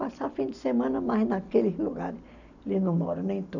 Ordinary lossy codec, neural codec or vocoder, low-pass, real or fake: none; none; 7.2 kHz; real